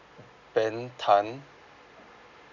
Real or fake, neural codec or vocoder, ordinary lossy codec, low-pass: real; none; none; 7.2 kHz